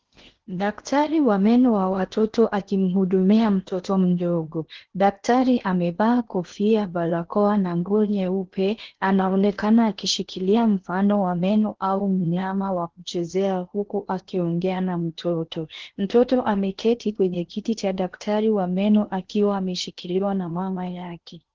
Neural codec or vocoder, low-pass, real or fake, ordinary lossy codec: codec, 16 kHz in and 24 kHz out, 0.8 kbps, FocalCodec, streaming, 65536 codes; 7.2 kHz; fake; Opus, 16 kbps